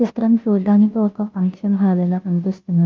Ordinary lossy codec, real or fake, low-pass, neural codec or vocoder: Opus, 32 kbps; fake; 7.2 kHz; codec, 16 kHz, 0.5 kbps, FunCodec, trained on Chinese and English, 25 frames a second